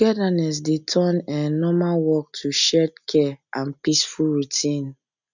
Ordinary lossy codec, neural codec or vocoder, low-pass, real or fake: none; none; 7.2 kHz; real